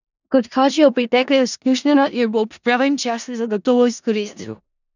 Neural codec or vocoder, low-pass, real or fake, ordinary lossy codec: codec, 16 kHz in and 24 kHz out, 0.4 kbps, LongCat-Audio-Codec, four codebook decoder; 7.2 kHz; fake; none